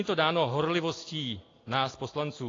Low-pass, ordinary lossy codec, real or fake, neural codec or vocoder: 7.2 kHz; AAC, 32 kbps; real; none